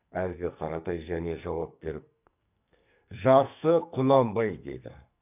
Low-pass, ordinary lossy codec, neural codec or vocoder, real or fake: 3.6 kHz; none; codec, 44.1 kHz, 2.6 kbps, SNAC; fake